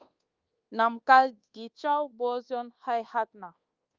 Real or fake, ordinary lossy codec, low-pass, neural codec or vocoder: fake; Opus, 24 kbps; 7.2 kHz; codec, 24 kHz, 1.2 kbps, DualCodec